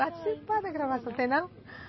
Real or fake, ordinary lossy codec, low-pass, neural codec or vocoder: real; MP3, 24 kbps; 7.2 kHz; none